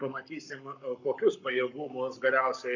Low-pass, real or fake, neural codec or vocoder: 7.2 kHz; fake; codec, 44.1 kHz, 7.8 kbps, Pupu-Codec